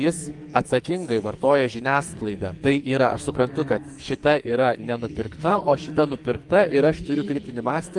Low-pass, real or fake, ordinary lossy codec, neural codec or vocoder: 10.8 kHz; fake; Opus, 32 kbps; codec, 44.1 kHz, 2.6 kbps, SNAC